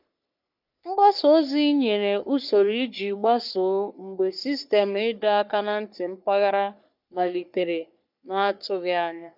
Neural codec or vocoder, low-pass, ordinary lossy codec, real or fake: codec, 44.1 kHz, 3.4 kbps, Pupu-Codec; 5.4 kHz; none; fake